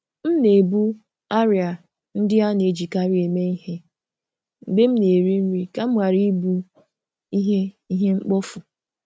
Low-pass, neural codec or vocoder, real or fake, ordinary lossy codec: none; none; real; none